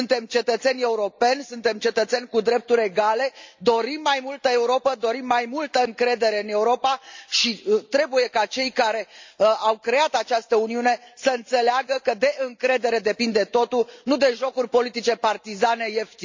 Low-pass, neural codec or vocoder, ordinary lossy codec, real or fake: 7.2 kHz; none; MP3, 64 kbps; real